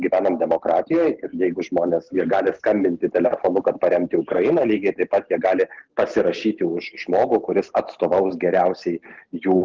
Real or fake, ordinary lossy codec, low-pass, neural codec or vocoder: real; Opus, 16 kbps; 7.2 kHz; none